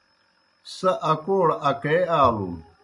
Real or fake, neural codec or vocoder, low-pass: real; none; 10.8 kHz